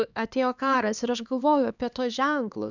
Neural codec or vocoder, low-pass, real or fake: codec, 16 kHz, 2 kbps, X-Codec, HuBERT features, trained on LibriSpeech; 7.2 kHz; fake